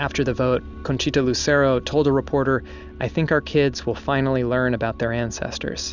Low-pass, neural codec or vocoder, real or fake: 7.2 kHz; none; real